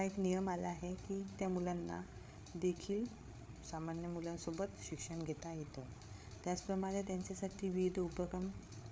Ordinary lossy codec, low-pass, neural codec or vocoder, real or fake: none; none; codec, 16 kHz, 8 kbps, FreqCodec, larger model; fake